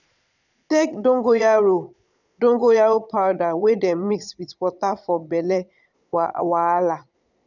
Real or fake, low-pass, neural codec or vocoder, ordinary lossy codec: fake; 7.2 kHz; vocoder, 22.05 kHz, 80 mel bands, Vocos; none